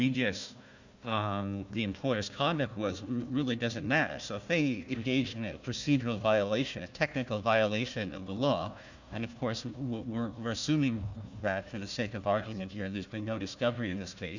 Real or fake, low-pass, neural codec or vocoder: fake; 7.2 kHz; codec, 16 kHz, 1 kbps, FunCodec, trained on Chinese and English, 50 frames a second